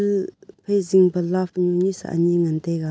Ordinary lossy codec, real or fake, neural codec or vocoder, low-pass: none; real; none; none